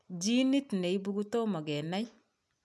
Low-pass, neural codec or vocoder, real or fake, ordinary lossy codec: none; none; real; none